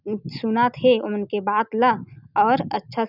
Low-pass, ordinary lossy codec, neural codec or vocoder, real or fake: 5.4 kHz; none; none; real